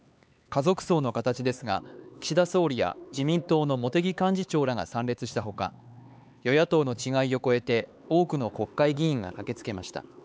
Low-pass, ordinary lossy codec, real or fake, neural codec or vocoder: none; none; fake; codec, 16 kHz, 4 kbps, X-Codec, HuBERT features, trained on LibriSpeech